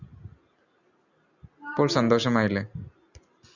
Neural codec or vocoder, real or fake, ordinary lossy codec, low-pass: none; real; Opus, 64 kbps; 7.2 kHz